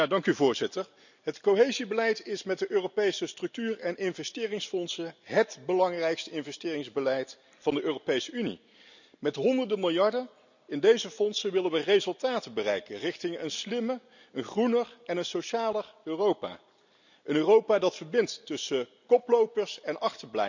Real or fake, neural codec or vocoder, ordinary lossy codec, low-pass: real; none; none; 7.2 kHz